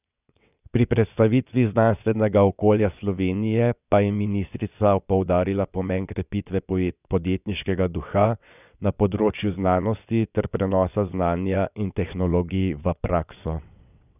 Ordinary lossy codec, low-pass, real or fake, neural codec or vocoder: none; 3.6 kHz; fake; vocoder, 22.05 kHz, 80 mel bands, WaveNeXt